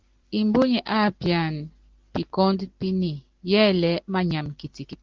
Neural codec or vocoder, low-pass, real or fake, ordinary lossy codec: none; 7.2 kHz; real; Opus, 16 kbps